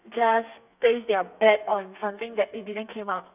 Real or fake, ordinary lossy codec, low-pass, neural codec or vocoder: fake; none; 3.6 kHz; codec, 32 kHz, 1.9 kbps, SNAC